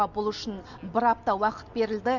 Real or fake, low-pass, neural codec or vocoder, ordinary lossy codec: real; 7.2 kHz; none; none